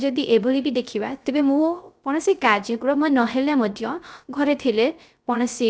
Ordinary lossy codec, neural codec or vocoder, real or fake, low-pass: none; codec, 16 kHz, 0.3 kbps, FocalCodec; fake; none